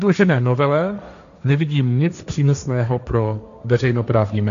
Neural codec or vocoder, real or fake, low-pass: codec, 16 kHz, 1.1 kbps, Voila-Tokenizer; fake; 7.2 kHz